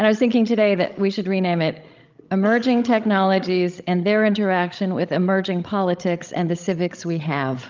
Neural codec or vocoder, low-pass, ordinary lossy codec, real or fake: codec, 16 kHz, 16 kbps, FreqCodec, larger model; 7.2 kHz; Opus, 24 kbps; fake